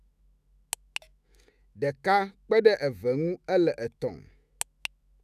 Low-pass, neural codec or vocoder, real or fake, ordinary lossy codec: 14.4 kHz; autoencoder, 48 kHz, 128 numbers a frame, DAC-VAE, trained on Japanese speech; fake; none